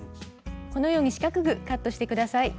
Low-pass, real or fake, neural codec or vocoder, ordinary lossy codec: none; real; none; none